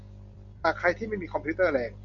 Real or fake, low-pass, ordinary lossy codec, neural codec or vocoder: real; 7.2 kHz; Opus, 64 kbps; none